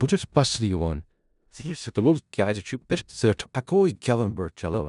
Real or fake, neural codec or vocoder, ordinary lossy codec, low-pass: fake; codec, 16 kHz in and 24 kHz out, 0.4 kbps, LongCat-Audio-Codec, four codebook decoder; none; 10.8 kHz